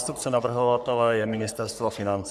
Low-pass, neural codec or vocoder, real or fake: 14.4 kHz; codec, 44.1 kHz, 3.4 kbps, Pupu-Codec; fake